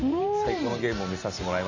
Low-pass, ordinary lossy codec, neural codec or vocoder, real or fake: 7.2 kHz; none; vocoder, 44.1 kHz, 128 mel bands every 512 samples, BigVGAN v2; fake